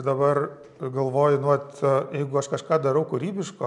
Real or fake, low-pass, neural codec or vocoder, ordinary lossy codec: real; 10.8 kHz; none; MP3, 96 kbps